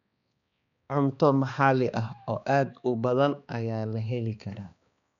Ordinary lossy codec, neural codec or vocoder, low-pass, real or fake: none; codec, 16 kHz, 2 kbps, X-Codec, HuBERT features, trained on balanced general audio; 7.2 kHz; fake